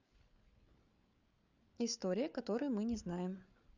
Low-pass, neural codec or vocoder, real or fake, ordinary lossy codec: 7.2 kHz; codec, 16 kHz, 8 kbps, FreqCodec, larger model; fake; none